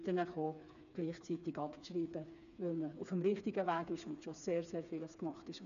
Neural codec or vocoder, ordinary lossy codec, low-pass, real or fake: codec, 16 kHz, 4 kbps, FreqCodec, smaller model; none; 7.2 kHz; fake